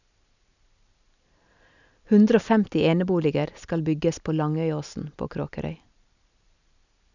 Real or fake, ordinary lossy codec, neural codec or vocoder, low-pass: real; none; none; 7.2 kHz